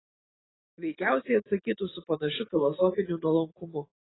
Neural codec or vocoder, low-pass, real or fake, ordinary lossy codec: none; 7.2 kHz; real; AAC, 16 kbps